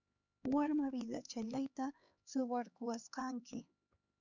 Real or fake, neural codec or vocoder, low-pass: fake; codec, 16 kHz, 4 kbps, X-Codec, HuBERT features, trained on LibriSpeech; 7.2 kHz